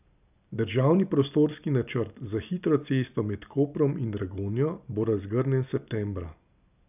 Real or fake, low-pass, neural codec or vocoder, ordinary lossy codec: real; 3.6 kHz; none; none